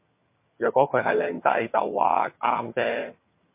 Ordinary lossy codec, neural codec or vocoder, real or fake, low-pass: MP3, 24 kbps; vocoder, 22.05 kHz, 80 mel bands, HiFi-GAN; fake; 3.6 kHz